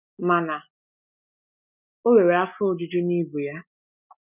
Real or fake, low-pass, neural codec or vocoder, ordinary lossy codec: real; 3.6 kHz; none; none